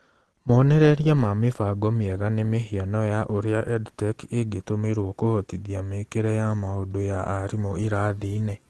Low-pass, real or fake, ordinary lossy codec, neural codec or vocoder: 10.8 kHz; real; Opus, 16 kbps; none